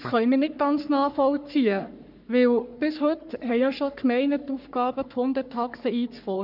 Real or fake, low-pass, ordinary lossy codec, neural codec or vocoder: fake; 5.4 kHz; none; codec, 44.1 kHz, 3.4 kbps, Pupu-Codec